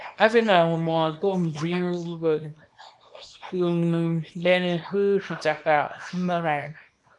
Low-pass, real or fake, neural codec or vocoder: 10.8 kHz; fake; codec, 24 kHz, 0.9 kbps, WavTokenizer, small release